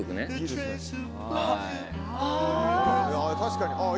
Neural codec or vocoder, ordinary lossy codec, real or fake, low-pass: none; none; real; none